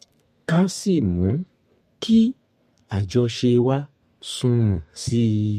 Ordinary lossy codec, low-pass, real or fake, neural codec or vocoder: MP3, 64 kbps; 14.4 kHz; fake; codec, 32 kHz, 1.9 kbps, SNAC